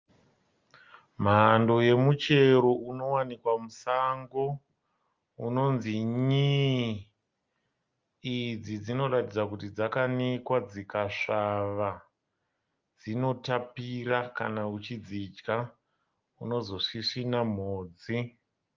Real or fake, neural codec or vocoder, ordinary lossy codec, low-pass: real; none; Opus, 32 kbps; 7.2 kHz